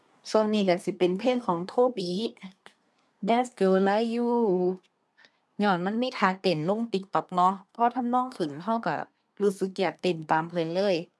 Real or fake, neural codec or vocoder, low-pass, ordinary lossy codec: fake; codec, 24 kHz, 1 kbps, SNAC; none; none